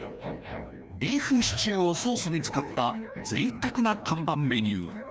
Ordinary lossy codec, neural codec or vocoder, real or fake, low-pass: none; codec, 16 kHz, 1 kbps, FreqCodec, larger model; fake; none